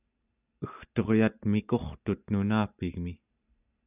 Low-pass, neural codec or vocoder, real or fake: 3.6 kHz; none; real